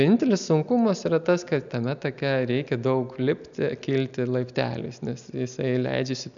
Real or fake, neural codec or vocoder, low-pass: real; none; 7.2 kHz